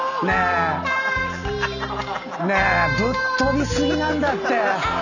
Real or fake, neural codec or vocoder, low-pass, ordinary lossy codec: real; none; 7.2 kHz; none